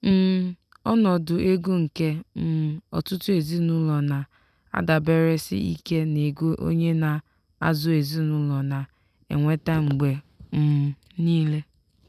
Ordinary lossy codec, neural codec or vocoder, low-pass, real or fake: none; none; 14.4 kHz; real